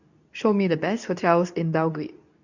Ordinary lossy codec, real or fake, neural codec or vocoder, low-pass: none; fake; codec, 24 kHz, 0.9 kbps, WavTokenizer, medium speech release version 2; 7.2 kHz